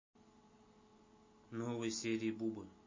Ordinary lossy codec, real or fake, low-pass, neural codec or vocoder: MP3, 32 kbps; real; 7.2 kHz; none